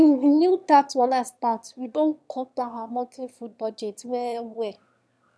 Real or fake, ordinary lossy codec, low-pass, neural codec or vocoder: fake; none; none; autoencoder, 22.05 kHz, a latent of 192 numbers a frame, VITS, trained on one speaker